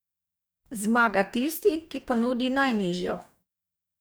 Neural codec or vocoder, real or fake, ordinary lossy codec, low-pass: codec, 44.1 kHz, 2.6 kbps, DAC; fake; none; none